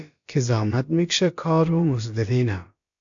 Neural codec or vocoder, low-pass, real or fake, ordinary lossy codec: codec, 16 kHz, about 1 kbps, DyCAST, with the encoder's durations; 7.2 kHz; fake; AAC, 64 kbps